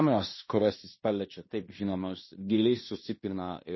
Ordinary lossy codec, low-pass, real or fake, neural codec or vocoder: MP3, 24 kbps; 7.2 kHz; fake; codec, 16 kHz in and 24 kHz out, 0.9 kbps, LongCat-Audio-Codec, fine tuned four codebook decoder